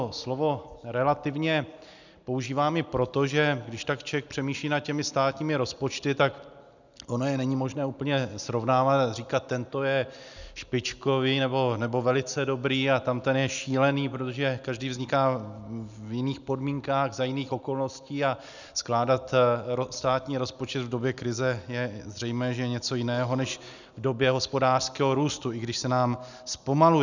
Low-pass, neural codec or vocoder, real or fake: 7.2 kHz; none; real